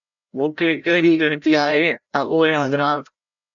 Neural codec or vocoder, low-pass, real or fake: codec, 16 kHz, 0.5 kbps, FreqCodec, larger model; 7.2 kHz; fake